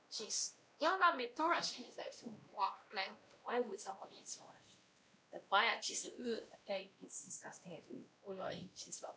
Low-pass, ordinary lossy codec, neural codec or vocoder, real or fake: none; none; codec, 16 kHz, 1 kbps, X-Codec, WavLM features, trained on Multilingual LibriSpeech; fake